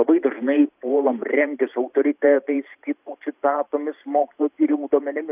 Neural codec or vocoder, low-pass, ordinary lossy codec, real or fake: vocoder, 44.1 kHz, 128 mel bands every 512 samples, BigVGAN v2; 3.6 kHz; AAC, 32 kbps; fake